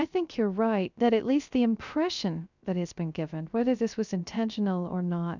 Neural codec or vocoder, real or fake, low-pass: codec, 16 kHz, 0.3 kbps, FocalCodec; fake; 7.2 kHz